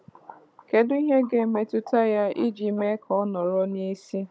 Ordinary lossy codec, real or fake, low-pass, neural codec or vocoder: none; fake; none; codec, 16 kHz, 16 kbps, FunCodec, trained on Chinese and English, 50 frames a second